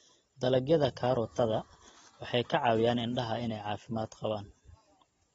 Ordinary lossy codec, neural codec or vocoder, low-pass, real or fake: AAC, 24 kbps; none; 19.8 kHz; real